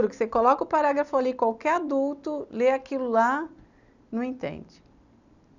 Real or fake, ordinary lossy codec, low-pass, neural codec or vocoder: real; none; 7.2 kHz; none